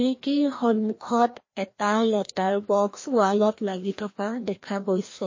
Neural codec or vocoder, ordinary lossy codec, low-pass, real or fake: codec, 16 kHz, 1 kbps, FreqCodec, larger model; MP3, 32 kbps; 7.2 kHz; fake